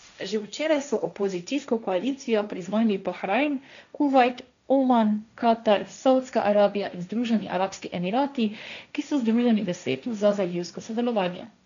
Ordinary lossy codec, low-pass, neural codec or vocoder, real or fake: MP3, 96 kbps; 7.2 kHz; codec, 16 kHz, 1.1 kbps, Voila-Tokenizer; fake